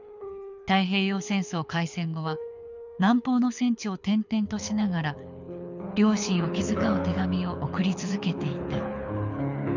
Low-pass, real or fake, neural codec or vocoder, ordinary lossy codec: 7.2 kHz; fake; codec, 24 kHz, 6 kbps, HILCodec; none